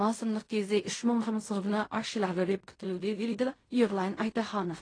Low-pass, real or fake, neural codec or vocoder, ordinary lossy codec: 9.9 kHz; fake; codec, 16 kHz in and 24 kHz out, 0.4 kbps, LongCat-Audio-Codec, fine tuned four codebook decoder; AAC, 32 kbps